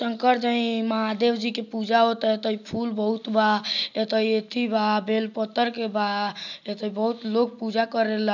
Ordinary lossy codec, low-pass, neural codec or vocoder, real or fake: none; 7.2 kHz; none; real